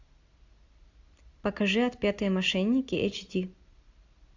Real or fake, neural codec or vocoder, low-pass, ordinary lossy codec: real; none; 7.2 kHz; AAC, 48 kbps